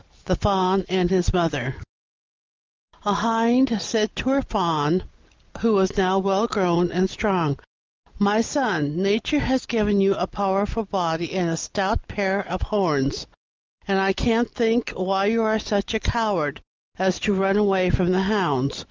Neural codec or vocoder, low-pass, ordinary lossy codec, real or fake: none; 7.2 kHz; Opus, 32 kbps; real